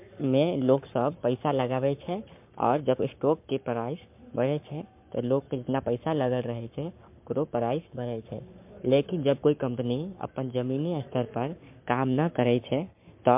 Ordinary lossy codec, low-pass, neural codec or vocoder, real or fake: MP3, 32 kbps; 3.6 kHz; codec, 44.1 kHz, 7.8 kbps, Pupu-Codec; fake